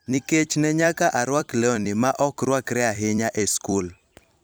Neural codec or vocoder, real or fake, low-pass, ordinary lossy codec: vocoder, 44.1 kHz, 128 mel bands every 256 samples, BigVGAN v2; fake; none; none